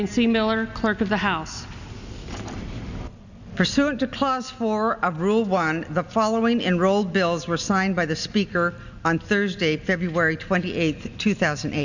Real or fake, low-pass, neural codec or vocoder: real; 7.2 kHz; none